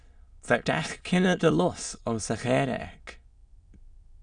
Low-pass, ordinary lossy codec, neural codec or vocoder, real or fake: 9.9 kHz; Opus, 64 kbps; autoencoder, 22.05 kHz, a latent of 192 numbers a frame, VITS, trained on many speakers; fake